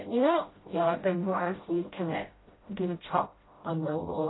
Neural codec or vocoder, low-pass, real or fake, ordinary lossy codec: codec, 16 kHz, 0.5 kbps, FreqCodec, smaller model; 7.2 kHz; fake; AAC, 16 kbps